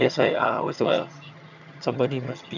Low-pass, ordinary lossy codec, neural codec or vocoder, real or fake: 7.2 kHz; none; vocoder, 22.05 kHz, 80 mel bands, HiFi-GAN; fake